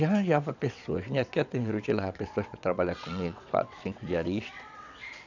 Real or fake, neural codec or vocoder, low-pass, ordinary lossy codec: fake; vocoder, 22.05 kHz, 80 mel bands, WaveNeXt; 7.2 kHz; none